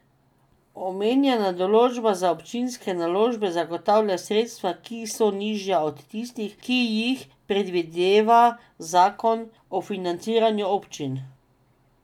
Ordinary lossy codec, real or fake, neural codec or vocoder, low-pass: none; real; none; 19.8 kHz